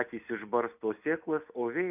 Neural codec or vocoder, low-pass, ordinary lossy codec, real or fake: none; 3.6 kHz; AAC, 32 kbps; real